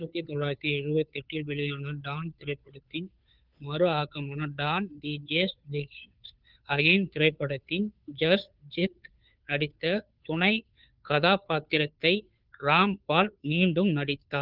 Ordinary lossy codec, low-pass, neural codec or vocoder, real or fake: Opus, 24 kbps; 5.4 kHz; codec, 16 kHz, 2 kbps, FunCodec, trained on Chinese and English, 25 frames a second; fake